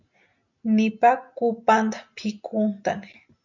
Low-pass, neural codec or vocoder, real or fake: 7.2 kHz; none; real